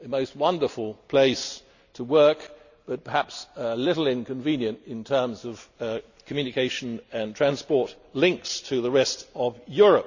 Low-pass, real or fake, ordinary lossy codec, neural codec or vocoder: 7.2 kHz; real; none; none